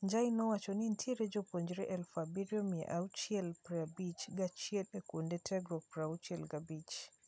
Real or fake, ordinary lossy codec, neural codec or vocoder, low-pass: real; none; none; none